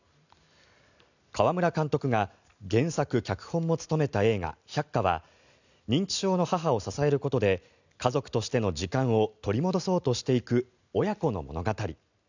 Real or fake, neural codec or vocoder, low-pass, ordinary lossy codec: real; none; 7.2 kHz; none